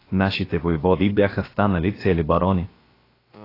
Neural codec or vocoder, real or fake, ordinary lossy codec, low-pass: codec, 16 kHz, about 1 kbps, DyCAST, with the encoder's durations; fake; AAC, 24 kbps; 5.4 kHz